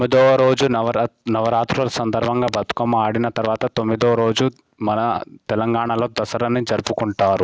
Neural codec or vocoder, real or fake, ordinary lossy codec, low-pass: none; real; none; none